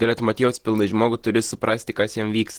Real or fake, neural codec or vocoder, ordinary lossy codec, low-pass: fake; vocoder, 48 kHz, 128 mel bands, Vocos; Opus, 16 kbps; 19.8 kHz